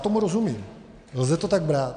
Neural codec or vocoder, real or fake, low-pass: none; real; 9.9 kHz